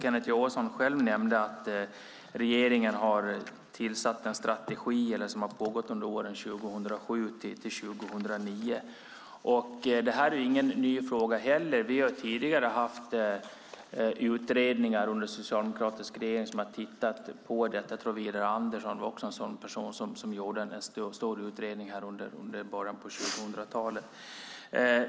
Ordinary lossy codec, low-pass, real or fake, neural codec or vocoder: none; none; real; none